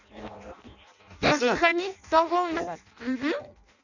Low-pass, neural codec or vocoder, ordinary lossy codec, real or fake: 7.2 kHz; codec, 16 kHz in and 24 kHz out, 0.6 kbps, FireRedTTS-2 codec; none; fake